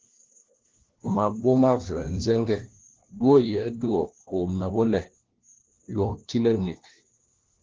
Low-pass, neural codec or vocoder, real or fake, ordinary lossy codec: 7.2 kHz; codec, 16 kHz, 1 kbps, FunCodec, trained on LibriTTS, 50 frames a second; fake; Opus, 16 kbps